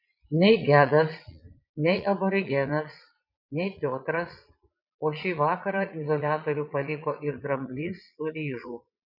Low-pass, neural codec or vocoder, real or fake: 5.4 kHz; vocoder, 22.05 kHz, 80 mel bands, Vocos; fake